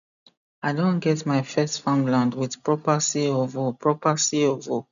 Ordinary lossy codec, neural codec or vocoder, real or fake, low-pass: none; none; real; 7.2 kHz